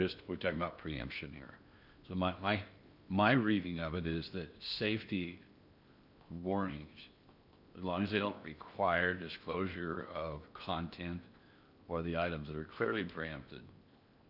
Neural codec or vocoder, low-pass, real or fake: codec, 16 kHz in and 24 kHz out, 0.8 kbps, FocalCodec, streaming, 65536 codes; 5.4 kHz; fake